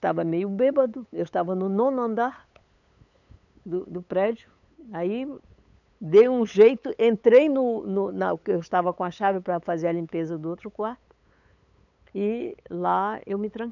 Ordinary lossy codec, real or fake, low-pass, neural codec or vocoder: none; fake; 7.2 kHz; codec, 16 kHz, 8 kbps, FunCodec, trained on LibriTTS, 25 frames a second